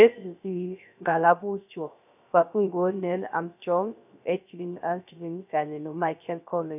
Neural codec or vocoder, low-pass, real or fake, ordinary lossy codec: codec, 16 kHz, 0.3 kbps, FocalCodec; 3.6 kHz; fake; none